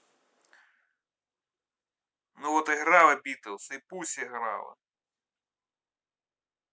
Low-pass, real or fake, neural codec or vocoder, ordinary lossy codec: none; real; none; none